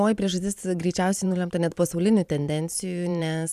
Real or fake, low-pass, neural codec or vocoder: fake; 14.4 kHz; vocoder, 44.1 kHz, 128 mel bands every 512 samples, BigVGAN v2